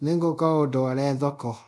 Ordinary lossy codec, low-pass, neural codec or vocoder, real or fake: none; none; codec, 24 kHz, 0.5 kbps, DualCodec; fake